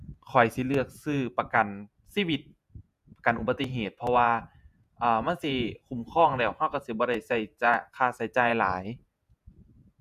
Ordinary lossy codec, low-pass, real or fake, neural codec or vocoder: none; 14.4 kHz; fake; vocoder, 48 kHz, 128 mel bands, Vocos